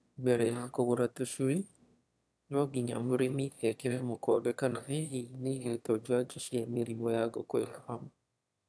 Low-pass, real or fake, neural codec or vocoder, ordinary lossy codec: none; fake; autoencoder, 22.05 kHz, a latent of 192 numbers a frame, VITS, trained on one speaker; none